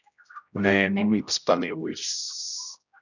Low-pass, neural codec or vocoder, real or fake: 7.2 kHz; codec, 16 kHz, 0.5 kbps, X-Codec, HuBERT features, trained on general audio; fake